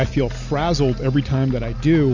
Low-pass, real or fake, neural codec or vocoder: 7.2 kHz; real; none